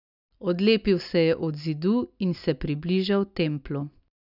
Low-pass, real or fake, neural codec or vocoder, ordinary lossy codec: 5.4 kHz; real; none; none